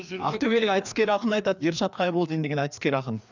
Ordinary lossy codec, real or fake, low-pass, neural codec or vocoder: none; fake; 7.2 kHz; codec, 16 kHz, 2 kbps, FreqCodec, larger model